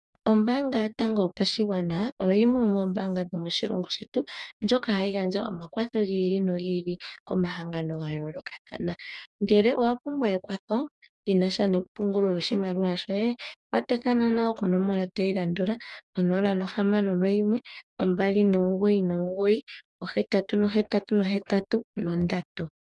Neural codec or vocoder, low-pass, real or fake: codec, 44.1 kHz, 2.6 kbps, DAC; 10.8 kHz; fake